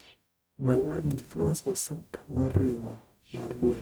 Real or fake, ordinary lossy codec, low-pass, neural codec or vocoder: fake; none; none; codec, 44.1 kHz, 0.9 kbps, DAC